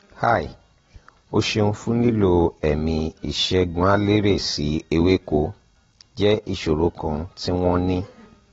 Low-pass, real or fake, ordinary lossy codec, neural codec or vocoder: 7.2 kHz; real; AAC, 24 kbps; none